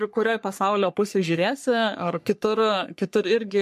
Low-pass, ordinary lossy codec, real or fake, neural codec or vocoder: 14.4 kHz; MP3, 64 kbps; fake; codec, 44.1 kHz, 3.4 kbps, Pupu-Codec